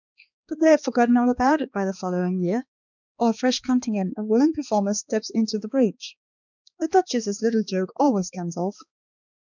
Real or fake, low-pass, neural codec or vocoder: fake; 7.2 kHz; codec, 16 kHz, 2 kbps, X-Codec, HuBERT features, trained on balanced general audio